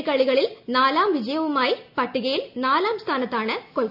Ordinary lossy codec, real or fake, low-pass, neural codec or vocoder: none; real; 5.4 kHz; none